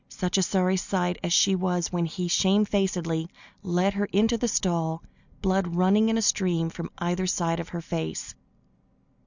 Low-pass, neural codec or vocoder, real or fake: 7.2 kHz; none; real